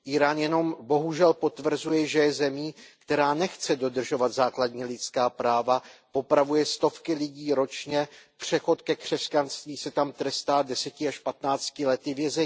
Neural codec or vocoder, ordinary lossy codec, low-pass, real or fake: none; none; none; real